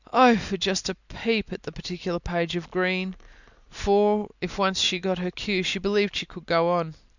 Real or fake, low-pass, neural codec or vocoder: real; 7.2 kHz; none